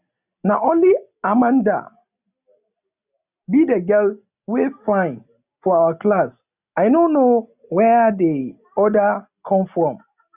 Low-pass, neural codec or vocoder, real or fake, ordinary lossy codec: 3.6 kHz; none; real; none